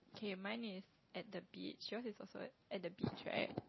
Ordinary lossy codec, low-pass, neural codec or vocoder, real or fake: MP3, 24 kbps; 7.2 kHz; none; real